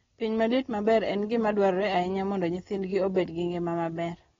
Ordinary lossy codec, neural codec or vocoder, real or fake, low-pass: AAC, 24 kbps; none; real; 7.2 kHz